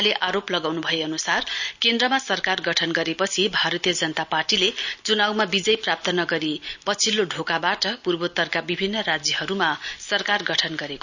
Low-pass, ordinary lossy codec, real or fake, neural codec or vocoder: 7.2 kHz; none; real; none